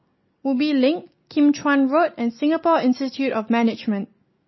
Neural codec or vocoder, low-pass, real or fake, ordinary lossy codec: none; 7.2 kHz; real; MP3, 24 kbps